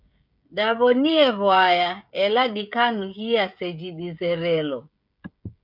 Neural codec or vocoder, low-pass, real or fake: codec, 16 kHz, 16 kbps, FreqCodec, smaller model; 5.4 kHz; fake